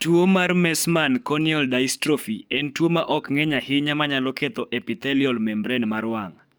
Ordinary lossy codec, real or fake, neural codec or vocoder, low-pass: none; fake; codec, 44.1 kHz, 7.8 kbps, DAC; none